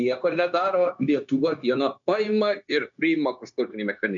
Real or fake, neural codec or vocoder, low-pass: fake; codec, 16 kHz, 0.9 kbps, LongCat-Audio-Codec; 7.2 kHz